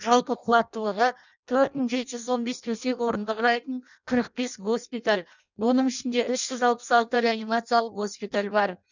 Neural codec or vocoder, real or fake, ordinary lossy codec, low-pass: codec, 16 kHz in and 24 kHz out, 0.6 kbps, FireRedTTS-2 codec; fake; none; 7.2 kHz